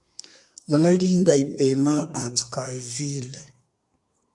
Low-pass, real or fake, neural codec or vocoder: 10.8 kHz; fake; codec, 24 kHz, 1 kbps, SNAC